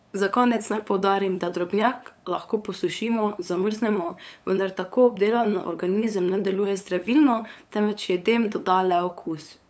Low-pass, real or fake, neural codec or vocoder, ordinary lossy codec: none; fake; codec, 16 kHz, 8 kbps, FunCodec, trained on LibriTTS, 25 frames a second; none